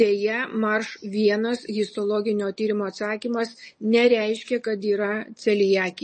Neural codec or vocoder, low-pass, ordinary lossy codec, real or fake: none; 10.8 kHz; MP3, 32 kbps; real